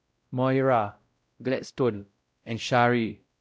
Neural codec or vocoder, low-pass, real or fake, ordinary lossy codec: codec, 16 kHz, 0.5 kbps, X-Codec, WavLM features, trained on Multilingual LibriSpeech; none; fake; none